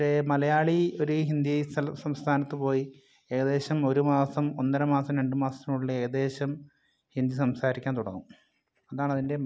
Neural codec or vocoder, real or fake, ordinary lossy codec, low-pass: none; real; none; none